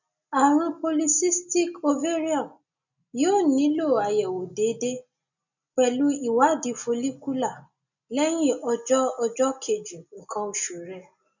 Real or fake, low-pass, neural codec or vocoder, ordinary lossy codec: real; 7.2 kHz; none; none